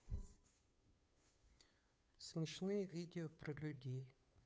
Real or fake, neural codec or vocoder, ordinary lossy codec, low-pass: fake; codec, 16 kHz, 2 kbps, FunCodec, trained on Chinese and English, 25 frames a second; none; none